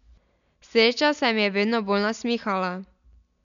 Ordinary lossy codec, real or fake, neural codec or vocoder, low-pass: none; real; none; 7.2 kHz